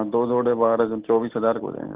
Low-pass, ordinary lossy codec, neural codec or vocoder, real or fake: 3.6 kHz; Opus, 16 kbps; none; real